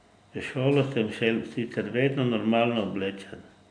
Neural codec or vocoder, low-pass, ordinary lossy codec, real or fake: none; 9.9 kHz; none; real